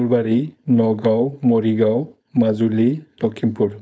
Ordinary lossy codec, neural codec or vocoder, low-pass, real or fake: none; codec, 16 kHz, 4.8 kbps, FACodec; none; fake